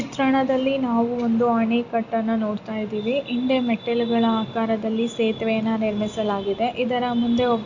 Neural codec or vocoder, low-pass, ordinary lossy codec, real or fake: none; 7.2 kHz; Opus, 64 kbps; real